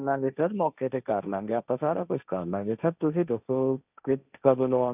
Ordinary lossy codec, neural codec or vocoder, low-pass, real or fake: none; codec, 16 kHz, 1.1 kbps, Voila-Tokenizer; 3.6 kHz; fake